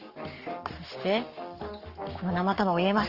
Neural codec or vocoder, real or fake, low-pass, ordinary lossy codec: codec, 44.1 kHz, 7.8 kbps, Pupu-Codec; fake; 5.4 kHz; Opus, 24 kbps